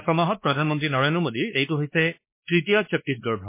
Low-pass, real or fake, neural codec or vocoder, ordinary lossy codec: 3.6 kHz; fake; codec, 16 kHz, 2 kbps, X-Codec, WavLM features, trained on Multilingual LibriSpeech; MP3, 24 kbps